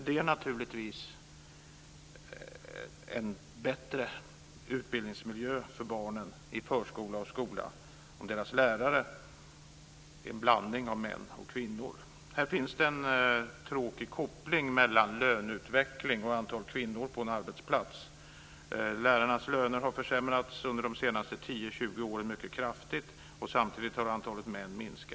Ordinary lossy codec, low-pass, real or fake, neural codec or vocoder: none; none; real; none